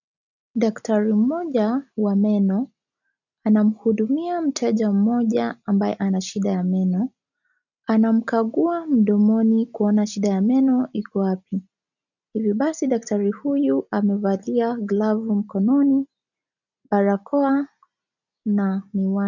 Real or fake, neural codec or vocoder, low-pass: real; none; 7.2 kHz